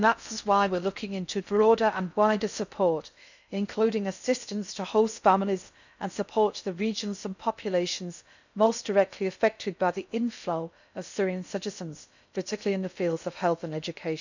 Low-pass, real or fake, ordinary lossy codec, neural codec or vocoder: 7.2 kHz; fake; none; codec, 16 kHz in and 24 kHz out, 0.6 kbps, FocalCodec, streaming, 4096 codes